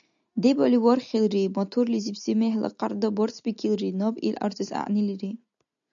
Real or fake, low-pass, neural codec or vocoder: real; 7.2 kHz; none